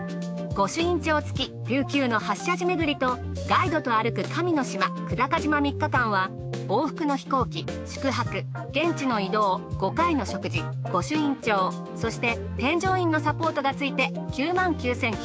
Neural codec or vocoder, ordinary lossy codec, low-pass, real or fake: codec, 16 kHz, 6 kbps, DAC; none; none; fake